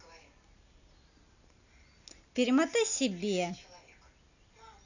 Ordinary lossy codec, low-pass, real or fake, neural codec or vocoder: none; 7.2 kHz; real; none